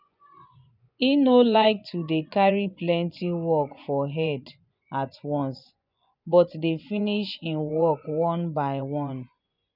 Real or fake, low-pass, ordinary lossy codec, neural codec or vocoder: fake; 5.4 kHz; none; vocoder, 24 kHz, 100 mel bands, Vocos